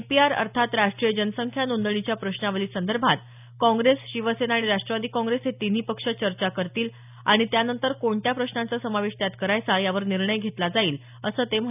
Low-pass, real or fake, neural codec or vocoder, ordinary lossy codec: 3.6 kHz; real; none; none